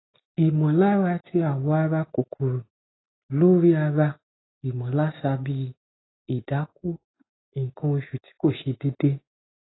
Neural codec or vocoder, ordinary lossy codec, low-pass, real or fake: none; AAC, 16 kbps; 7.2 kHz; real